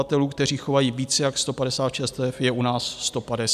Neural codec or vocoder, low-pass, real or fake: none; 14.4 kHz; real